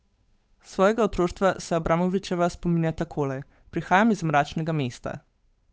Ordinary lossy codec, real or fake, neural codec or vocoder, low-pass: none; fake; codec, 16 kHz, 8 kbps, FunCodec, trained on Chinese and English, 25 frames a second; none